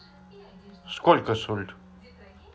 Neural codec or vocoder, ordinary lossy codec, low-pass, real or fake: none; none; none; real